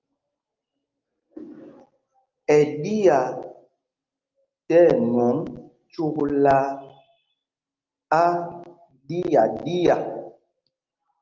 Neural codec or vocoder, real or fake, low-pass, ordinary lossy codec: none; real; 7.2 kHz; Opus, 32 kbps